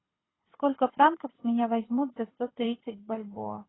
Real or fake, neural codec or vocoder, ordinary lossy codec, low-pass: fake; codec, 24 kHz, 6 kbps, HILCodec; AAC, 16 kbps; 7.2 kHz